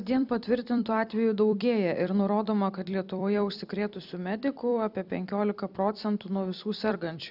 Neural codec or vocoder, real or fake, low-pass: vocoder, 44.1 kHz, 128 mel bands every 256 samples, BigVGAN v2; fake; 5.4 kHz